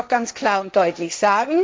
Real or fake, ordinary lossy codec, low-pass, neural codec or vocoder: fake; none; none; codec, 16 kHz, 1.1 kbps, Voila-Tokenizer